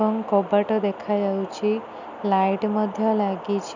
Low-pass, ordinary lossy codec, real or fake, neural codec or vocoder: 7.2 kHz; none; real; none